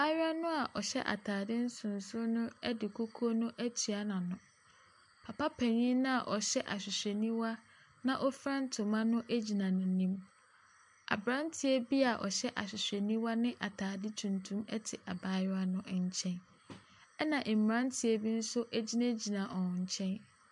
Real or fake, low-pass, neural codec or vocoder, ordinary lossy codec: real; 10.8 kHz; none; MP3, 64 kbps